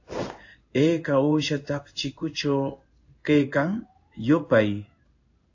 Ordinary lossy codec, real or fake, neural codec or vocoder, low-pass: AAC, 48 kbps; fake; codec, 16 kHz in and 24 kHz out, 1 kbps, XY-Tokenizer; 7.2 kHz